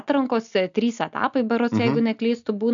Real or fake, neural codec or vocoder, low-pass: real; none; 7.2 kHz